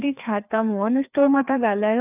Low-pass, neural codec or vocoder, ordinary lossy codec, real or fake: 3.6 kHz; codec, 16 kHz, 2 kbps, FreqCodec, larger model; none; fake